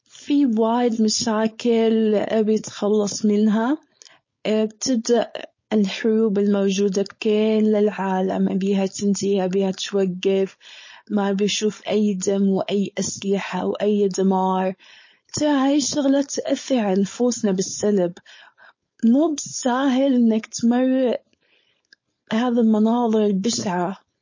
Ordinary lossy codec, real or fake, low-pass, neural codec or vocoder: MP3, 32 kbps; fake; 7.2 kHz; codec, 16 kHz, 4.8 kbps, FACodec